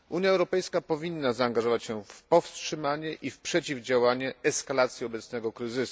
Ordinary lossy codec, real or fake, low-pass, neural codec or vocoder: none; real; none; none